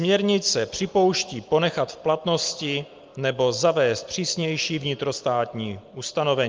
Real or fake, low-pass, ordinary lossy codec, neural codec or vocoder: real; 7.2 kHz; Opus, 24 kbps; none